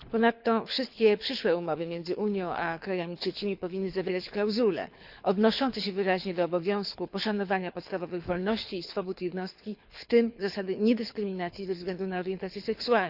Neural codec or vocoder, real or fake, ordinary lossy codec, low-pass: codec, 24 kHz, 6 kbps, HILCodec; fake; Opus, 64 kbps; 5.4 kHz